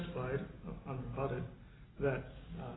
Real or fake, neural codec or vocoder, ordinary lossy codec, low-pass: real; none; AAC, 16 kbps; 7.2 kHz